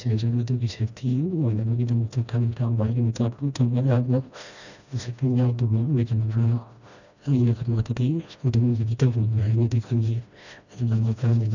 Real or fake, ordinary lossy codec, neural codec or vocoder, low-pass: fake; none; codec, 16 kHz, 1 kbps, FreqCodec, smaller model; 7.2 kHz